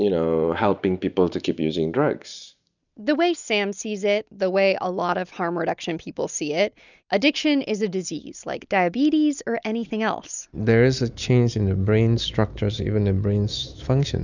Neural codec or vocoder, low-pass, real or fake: none; 7.2 kHz; real